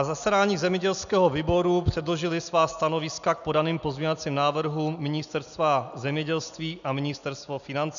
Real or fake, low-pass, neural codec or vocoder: real; 7.2 kHz; none